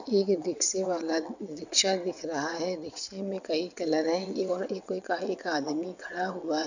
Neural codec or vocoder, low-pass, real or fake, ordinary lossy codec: vocoder, 22.05 kHz, 80 mel bands, WaveNeXt; 7.2 kHz; fake; none